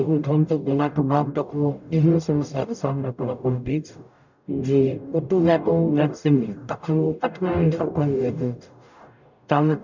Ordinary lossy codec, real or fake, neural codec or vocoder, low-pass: none; fake; codec, 44.1 kHz, 0.9 kbps, DAC; 7.2 kHz